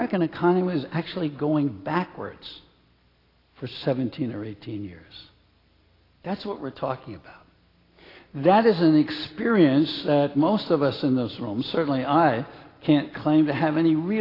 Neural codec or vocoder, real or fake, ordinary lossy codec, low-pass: none; real; AAC, 24 kbps; 5.4 kHz